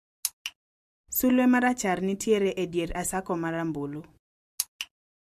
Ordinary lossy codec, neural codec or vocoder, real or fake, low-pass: MP3, 64 kbps; none; real; 14.4 kHz